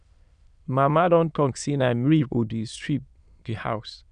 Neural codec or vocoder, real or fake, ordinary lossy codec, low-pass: autoencoder, 22.05 kHz, a latent of 192 numbers a frame, VITS, trained on many speakers; fake; none; 9.9 kHz